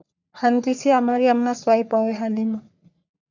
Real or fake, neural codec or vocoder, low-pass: fake; codec, 44.1 kHz, 3.4 kbps, Pupu-Codec; 7.2 kHz